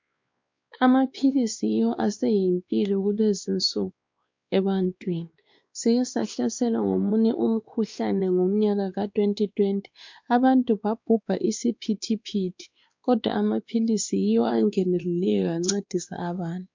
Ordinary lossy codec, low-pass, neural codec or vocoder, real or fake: MP3, 64 kbps; 7.2 kHz; codec, 16 kHz, 2 kbps, X-Codec, WavLM features, trained on Multilingual LibriSpeech; fake